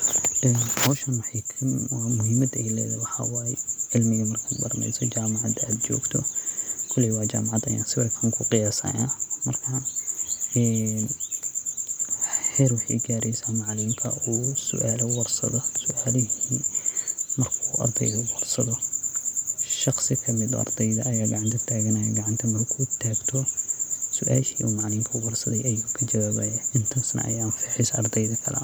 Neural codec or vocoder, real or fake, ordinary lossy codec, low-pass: none; real; none; none